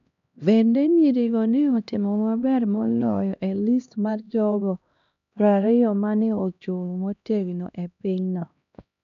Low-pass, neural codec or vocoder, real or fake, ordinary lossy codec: 7.2 kHz; codec, 16 kHz, 1 kbps, X-Codec, HuBERT features, trained on LibriSpeech; fake; none